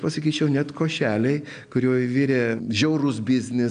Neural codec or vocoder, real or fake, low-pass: none; real; 9.9 kHz